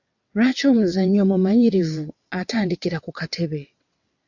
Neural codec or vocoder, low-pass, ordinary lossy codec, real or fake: vocoder, 22.05 kHz, 80 mel bands, WaveNeXt; 7.2 kHz; AAC, 48 kbps; fake